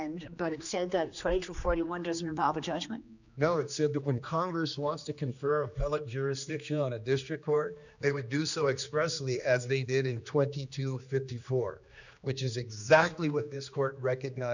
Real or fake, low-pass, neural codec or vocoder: fake; 7.2 kHz; codec, 16 kHz, 2 kbps, X-Codec, HuBERT features, trained on general audio